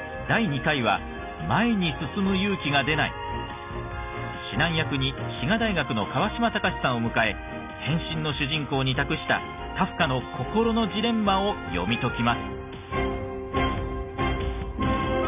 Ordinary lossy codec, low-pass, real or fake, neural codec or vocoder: none; 3.6 kHz; real; none